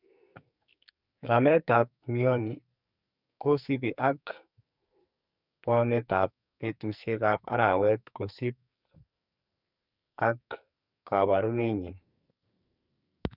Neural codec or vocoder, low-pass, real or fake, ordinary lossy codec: codec, 44.1 kHz, 2.6 kbps, SNAC; 5.4 kHz; fake; none